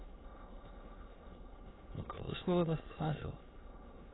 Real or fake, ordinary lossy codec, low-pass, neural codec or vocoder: fake; AAC, 16 kbps; 7.2 kHz; autoencoder, 22.05 kHz, a latent of 192 numbers a frame, VITS, trained on many speakers